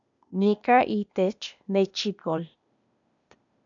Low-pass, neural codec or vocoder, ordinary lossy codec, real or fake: 7.2 kHz; codec, 16 kHz, 0.8 kbps, ZipCodec; MP3, 96 kbps; fake